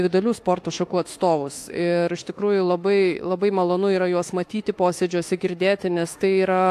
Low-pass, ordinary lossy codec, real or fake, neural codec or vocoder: 14.4 kHz; AAC, 64 kbps; fake; autoencoder, 48 kHz, 32 numbers a frame, DAC-VAE, trained on Japanese speech